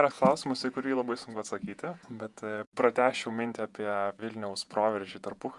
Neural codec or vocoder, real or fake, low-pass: vocoder, 48 kHz, 128 mel bands, Vocos; fake; 10.8 kHz